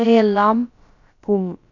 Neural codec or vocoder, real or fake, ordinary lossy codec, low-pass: codec, 16 kHz, about 1 kbps, DyCAST, with the encoder's durations; fake; none; 7.2 kHz